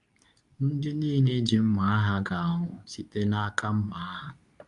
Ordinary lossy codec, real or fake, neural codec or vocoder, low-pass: none; fake; codec, 24 kHz, 0.9 kbps, WavTokenizer, medium speech release version 2; 10.8 kHz